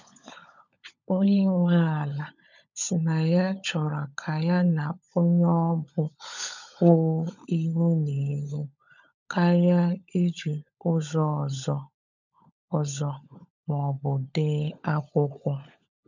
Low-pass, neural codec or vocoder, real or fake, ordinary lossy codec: 7.2 kHz; codec, 16 kHz, 16 kbps, FunCodec, trained on LibriTTS, 50 frames a second; fake; none